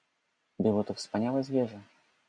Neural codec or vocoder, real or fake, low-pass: none; real; 9.9 kHz